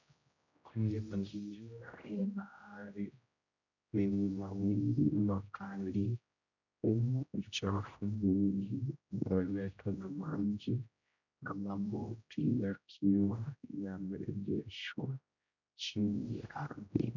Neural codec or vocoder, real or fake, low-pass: codec, 16 kHz, 0.5 kbps, X-Codec, HuBERT features, trained on general audio; fake; 7.2 kHz